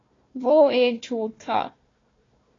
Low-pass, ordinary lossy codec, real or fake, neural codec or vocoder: 7.2 kHz; AAC, 32 kbps; fake; codec, 16 kHz, 1 kbps, FunCodec, trained on Chinese and English, 50 frames a second